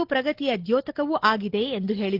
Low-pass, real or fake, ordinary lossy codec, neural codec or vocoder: 5.4 kHz; real; Opus, 16 kbps; none